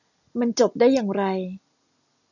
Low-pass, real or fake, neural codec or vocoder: 7.2 kHz; real; none